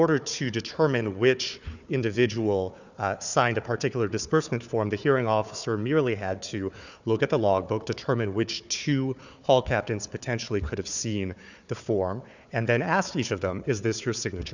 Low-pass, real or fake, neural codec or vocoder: 7.2 kHz; fake; codec, 16 kHz, 4 kbps, FunCodec, trained on Chinese and English, 50 frames a second